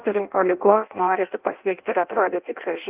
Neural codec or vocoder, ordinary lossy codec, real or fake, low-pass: codec, 16 kHz in and 24 kHz out, 0.6 kbps, FireRedTTS-2 codec; Opus, 24 kbps; fake; 3.6 kHz